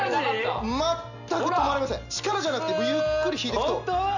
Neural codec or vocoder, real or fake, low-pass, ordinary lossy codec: none; real; 7.2 kHz; none